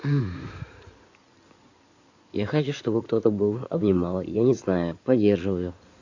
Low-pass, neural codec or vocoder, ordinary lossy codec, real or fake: 7.2 kHz; codec, 16 kHz in and 24 kHz out, 2.2 kbps, FireRedTTS-2 codec; AAC, 48 kbps; fake